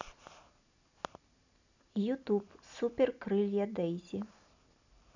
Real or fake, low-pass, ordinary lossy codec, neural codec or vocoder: real; 7.2 kHz; none; none